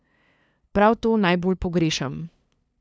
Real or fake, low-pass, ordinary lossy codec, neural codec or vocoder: fake; none; none; codec, 16 kHz, 2 kbps, FunCodec, trained on LibriTTS, 25 frames a second